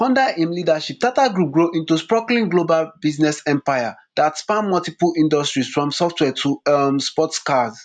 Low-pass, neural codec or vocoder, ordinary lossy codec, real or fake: 9.9 kHz; none; none; real